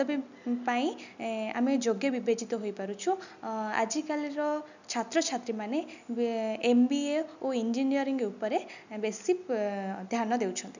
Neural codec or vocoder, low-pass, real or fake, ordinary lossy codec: none; 7.2 kHz; real; none